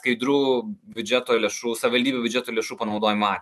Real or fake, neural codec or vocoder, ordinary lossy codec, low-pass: real; none; MP3, 96 kbps; 14.4 kHz